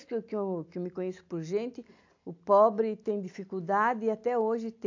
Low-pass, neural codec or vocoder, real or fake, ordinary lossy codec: 7.2 kHz; none; real; AAC, 48 kbps